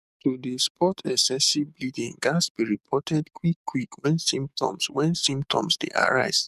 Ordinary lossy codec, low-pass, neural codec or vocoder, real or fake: none; 14.4 kHz; codec, 44.1 kHz, 7.8 kbps, DAC; fake